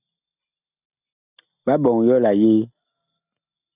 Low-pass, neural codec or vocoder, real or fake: 3.6 kHz; none; real